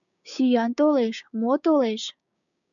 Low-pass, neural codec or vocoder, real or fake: 7.2 kHz; codec, 16 kHz, 6 kbps, DAC; fake